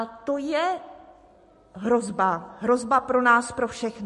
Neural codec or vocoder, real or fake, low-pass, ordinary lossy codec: none; real; 14.4 kHz; MP3, 48 kbps